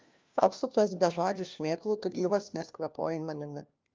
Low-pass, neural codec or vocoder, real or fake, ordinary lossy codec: 7.2 kHz; codec, 16 kHz, 1 kbps, FunCodec, trained on LibriTTS, 50 frames a second; fake; Opus, 24 kbps